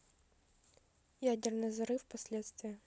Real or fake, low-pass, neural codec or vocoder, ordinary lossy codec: real; none; none; none